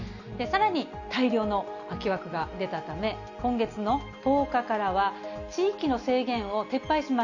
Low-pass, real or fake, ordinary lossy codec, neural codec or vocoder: 7.2 kHz; real; Opus, 64 kbps; none